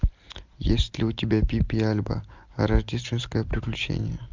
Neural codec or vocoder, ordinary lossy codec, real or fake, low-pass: none; MP3, 64 kbps; real; 7.2 kHz